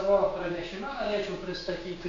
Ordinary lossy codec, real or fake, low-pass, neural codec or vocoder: MP3, 48 kbps; fake; 7.2 kHz; codec, 16 kHz, 6 kbps, DAC